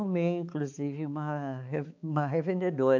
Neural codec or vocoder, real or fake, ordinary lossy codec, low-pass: codec, 16 kHz, 4 kbps, X-Codec, HuBERT features, trained on balanced general audio; fake; AAC, 48 kbps; 7.2 kHz